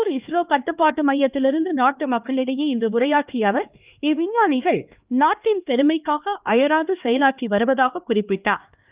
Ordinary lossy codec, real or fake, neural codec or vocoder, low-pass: Opus, 24 kbps; fake; codec, 16 kHz, 2 kbps, X-Codec, HuBERT features, trained on LibriSpeech; 3.6 kHz